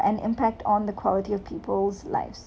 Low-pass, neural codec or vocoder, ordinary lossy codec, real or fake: none; none; none; real